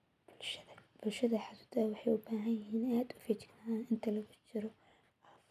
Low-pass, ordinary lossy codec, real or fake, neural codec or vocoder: 14.4 kHz; none; real; none